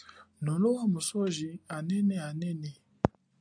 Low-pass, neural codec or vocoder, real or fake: 9.9 kHz; none; real